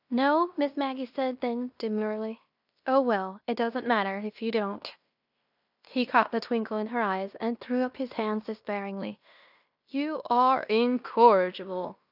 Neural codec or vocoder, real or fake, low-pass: codec, 16 kHz in and 24 kHz out, 0.9 kbps, LongCat-Audio-Codec, fine tuned four codebook decoder; fake; 5.4 kHz